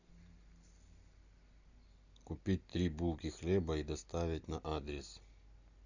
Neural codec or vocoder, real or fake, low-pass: none; real; 7.2 kHz